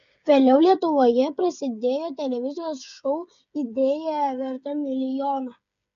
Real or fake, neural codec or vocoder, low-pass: fake; codec, 16 kHz, 8 kbps, FreqCodec, smaller model; 7.2 kHz